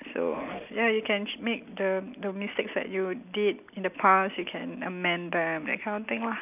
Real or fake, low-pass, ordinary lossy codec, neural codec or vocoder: real; 3.6 kHz; none; none